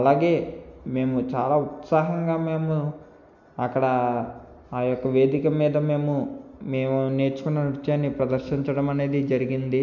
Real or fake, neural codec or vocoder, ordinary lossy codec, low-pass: real; none; none; 7.2 kHz